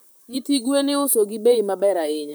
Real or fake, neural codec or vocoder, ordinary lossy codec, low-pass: fake; vocoder, 44.1 kHz, 128 mel bands, Pupu-Vocoder; none; none